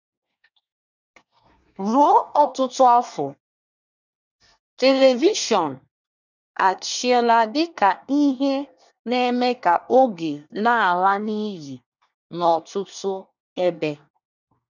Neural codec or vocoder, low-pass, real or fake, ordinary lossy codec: codec, 24 kHz, 1 kbps, SNAC; 7.2 kHz; fake; none